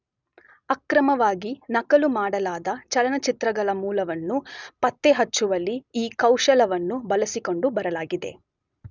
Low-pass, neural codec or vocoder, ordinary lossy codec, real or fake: 7.2 kHz; none; none; real